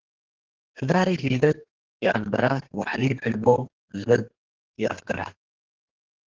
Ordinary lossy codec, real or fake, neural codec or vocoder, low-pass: Opus, 16 kbps; fake; codec, 32 kHz, 1.9 kbps, SNAC; 7.2 kHz